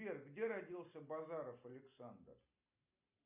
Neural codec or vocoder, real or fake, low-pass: none; real; 3.6 kHz